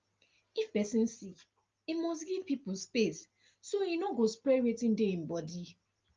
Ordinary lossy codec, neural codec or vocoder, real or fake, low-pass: Opus, 24 kbps; none; real; 7.2 kHz